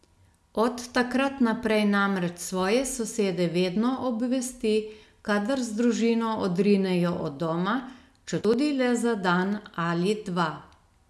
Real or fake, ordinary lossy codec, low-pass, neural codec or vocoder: real; none; none; none